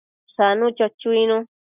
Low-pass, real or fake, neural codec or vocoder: 3.6 kHz; real; none